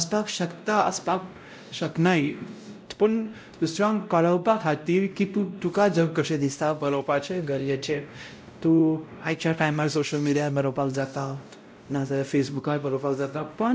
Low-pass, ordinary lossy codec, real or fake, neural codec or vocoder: none; none; fake; codec, 16 kHz, 0.5 kbps, X-Codec, WavLM features, trained on Multilingual LibriSpeech